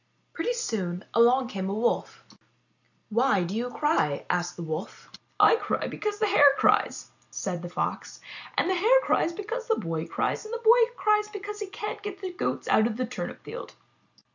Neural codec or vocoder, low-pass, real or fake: none; 7.2 kHz; real